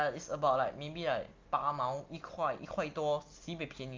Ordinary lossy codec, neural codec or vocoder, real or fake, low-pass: Opus, 24 kbps; none; real; 7.2 kHz